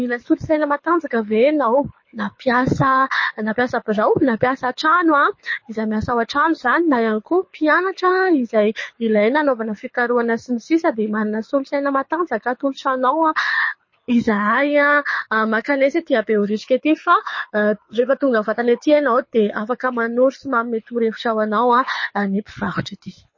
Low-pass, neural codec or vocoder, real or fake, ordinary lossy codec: 7.2 kHz; codec, 24 kHz, 6 kbps, HILCodec; fake; MP3, 32 kbps